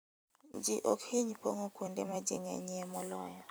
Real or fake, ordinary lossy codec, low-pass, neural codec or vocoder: fake; none; none; vocoder, 44.1 kHz, 128 mel bands every 256 samples, BigVGAN v2